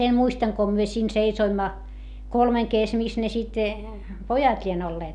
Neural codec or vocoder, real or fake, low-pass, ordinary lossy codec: none; real; 10.8 kHz; none